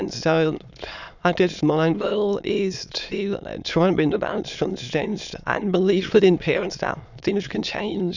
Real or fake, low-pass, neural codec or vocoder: fake; 7.2 kHz; autoencoder, 22.05 kHz, a latent of 192 numbers a frame, VITS, trained on many speakers